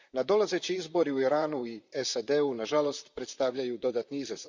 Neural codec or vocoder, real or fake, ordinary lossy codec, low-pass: vocoder, 44.1 kHz, 128 mel bands, Pupu-Vocoder; fake; Opus, 64 kbps; 7.2 kHz